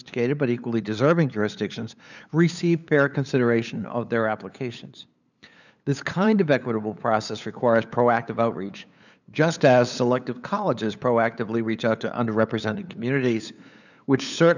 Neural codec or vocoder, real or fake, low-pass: codec, 16 kHz, 8 kbps, FunCodec, trained on LibriTTS, 25 frames a second; fake; 7.2 kHz